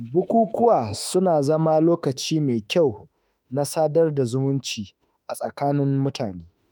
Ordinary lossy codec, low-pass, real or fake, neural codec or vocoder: none; none; fake; autoencoder, 48 kHz, 32 numbers a frame, DAC-VAE, trained on Japanese speech